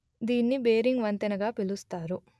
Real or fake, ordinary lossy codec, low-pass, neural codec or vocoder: real; none; none; none